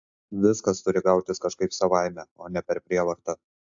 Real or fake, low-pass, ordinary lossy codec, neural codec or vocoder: real; 7.2 kHz; AAC, 64 kbps; none